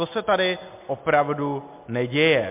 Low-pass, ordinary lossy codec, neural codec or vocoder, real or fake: 3.6 kHz; MP3, 32 kbps; none; real